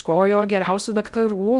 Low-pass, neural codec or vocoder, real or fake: 10.8 kHz; codec, 16 kHz in and 24 kHz out, 0.6 kbps, FocalCodec, streaming, 4096 codes; fake